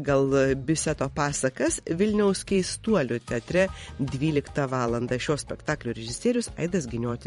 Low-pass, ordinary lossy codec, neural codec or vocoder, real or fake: 19.8 kHz; MP3, 48 kbps; vocoder, 44.1 kHz, 128 mel bands every 512 samples, BigVGAN v2; fake